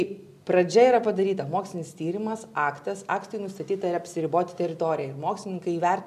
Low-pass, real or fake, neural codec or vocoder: 14.4 kHz; real; none